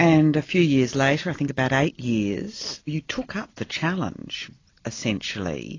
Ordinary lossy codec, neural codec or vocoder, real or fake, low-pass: AAC, 32 kbps; none; real; 7.2 kHz